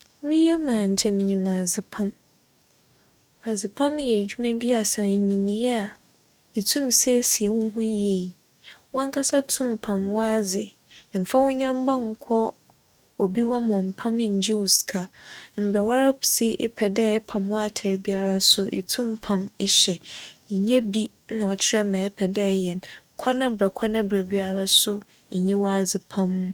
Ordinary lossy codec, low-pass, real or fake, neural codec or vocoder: none; 19.8 kHz; fake; codec, 44.1 kHz, 2.6 kbps, DAC